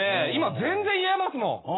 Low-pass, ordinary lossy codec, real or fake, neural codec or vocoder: 7.2 kHz; AAC, 16 kbps; real; none